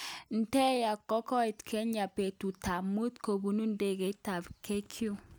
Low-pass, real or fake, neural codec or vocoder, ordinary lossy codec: none; real; none; none